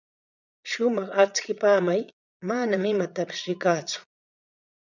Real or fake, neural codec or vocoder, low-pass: fake; vocoder, 22.05 kHz, 80 mel bands, Vocos; 7.2 kHz